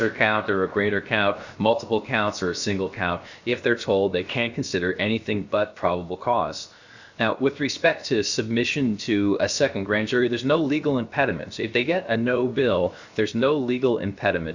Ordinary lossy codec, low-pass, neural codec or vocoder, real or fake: Opus, 64 kbps; 7.2 kHz; codec, 16 kHz, 0.7 kbps, FocalCodec; fake